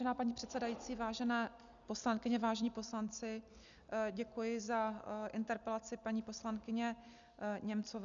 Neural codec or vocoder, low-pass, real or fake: none; 7.2 kHz; real